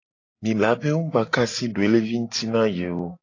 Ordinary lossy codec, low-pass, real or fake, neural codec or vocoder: AAC, 32 kbps; 7.2 kHz; real; none